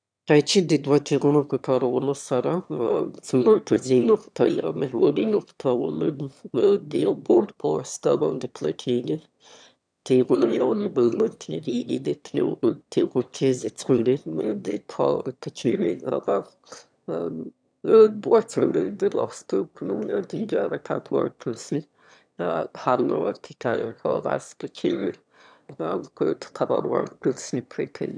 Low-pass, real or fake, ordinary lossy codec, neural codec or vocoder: 9.9 kHz; fake; none; autoencoder, 22.05 kHz, a latent of 192 numbers a frame, VITS, trained on one speaker